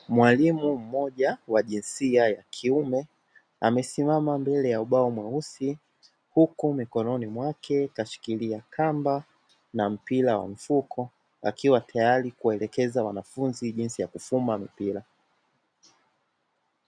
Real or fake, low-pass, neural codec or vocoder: real; 9.9 kHz; none